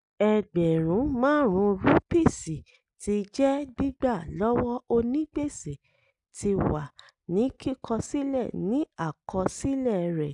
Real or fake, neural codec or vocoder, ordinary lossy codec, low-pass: real; none; none; 10.8 kHz